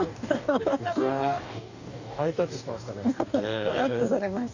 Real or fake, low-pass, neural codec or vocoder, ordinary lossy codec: fake; 7.2 kHz; codec, 44.1 kHz, 2.6 kbps, DAC; none